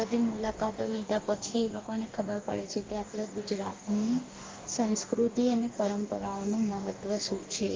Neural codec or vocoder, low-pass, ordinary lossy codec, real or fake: codec, 44.1 kHz, 2.6 kbps, DAC; 7.2 kHz; Opus, 32 kbps; fake